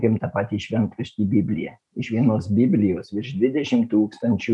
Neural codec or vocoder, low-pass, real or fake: none; 10.8 kHz; real